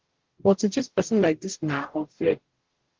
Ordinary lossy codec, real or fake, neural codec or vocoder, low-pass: Opus, 16 kbps; fake; codec, 44.1 kHz, 0.9 kbps, DAC; 7.2 kHz